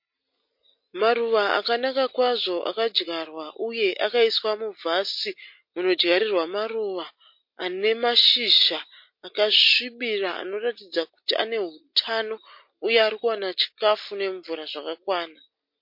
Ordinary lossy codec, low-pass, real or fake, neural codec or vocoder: MP3, 32 kbps; 5.4 kHz; real; none